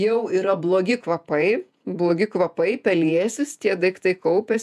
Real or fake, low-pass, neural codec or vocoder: fake; 14.4 kHz; vocoder, 48 kHz, 128 mel bands, Vocos